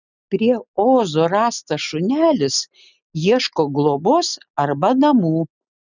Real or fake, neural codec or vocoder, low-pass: real; none; 7.2 kHz